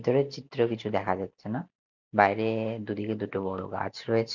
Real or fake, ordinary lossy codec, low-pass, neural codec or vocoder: real; none; 7.2 kHz; none